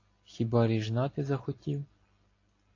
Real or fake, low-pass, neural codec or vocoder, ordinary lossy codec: real; 7.2 kHz; none; AAC, 32 kbps